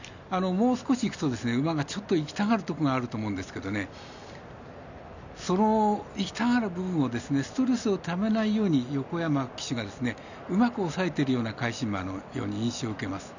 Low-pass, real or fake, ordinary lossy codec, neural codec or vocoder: 7.2 kHz; real; none; none